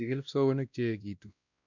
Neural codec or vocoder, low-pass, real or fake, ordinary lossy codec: codec, 16 kHz, 1 kbps, X-Codec, WavLM features, trained on Multilingual LibriSpeech; 7.2 kHz; fake; none